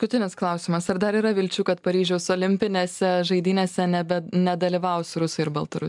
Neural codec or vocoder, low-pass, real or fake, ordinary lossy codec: none; 10.8 kHz; real; MP3, 96 kbps